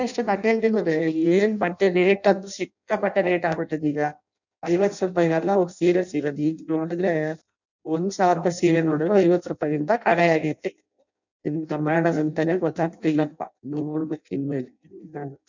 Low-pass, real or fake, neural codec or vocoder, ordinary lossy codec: 7.2 kHz; fake; codec, 16 kHz in and 24 kHz out, 0.6 kbps, FireRedTTS-2 codec; none